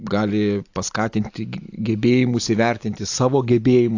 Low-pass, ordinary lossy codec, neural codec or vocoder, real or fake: 7.2 kHz; AAC, 48 kbps; none; real